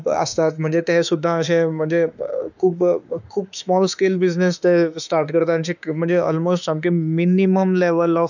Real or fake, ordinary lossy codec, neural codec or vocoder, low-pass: fake; none; autoencoder, 48 kHz, 32 numbers a frame, DAC-VAE, trained on Japanese speech; 7.2 kHz